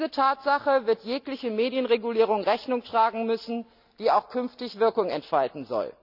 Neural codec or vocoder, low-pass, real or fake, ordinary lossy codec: none; 5.4 kHz; real; none